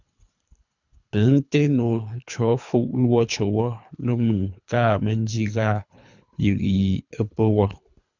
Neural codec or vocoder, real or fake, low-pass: codec, 24 kHz, 3 kbps, HILCodec; fake; 7.2 kHz